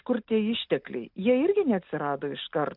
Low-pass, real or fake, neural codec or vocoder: 5.4 kHz; real; none